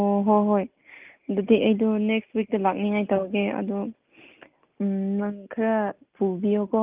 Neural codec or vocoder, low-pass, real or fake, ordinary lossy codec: none; 3.6 kHz; real; Opus, 24 kbps